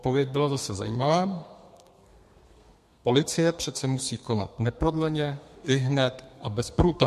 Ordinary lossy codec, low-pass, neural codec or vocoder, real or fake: MP3, 64 kbps; 14.4 kHz; codec, 32 kHz, 1.9 kbps, SNAC; fake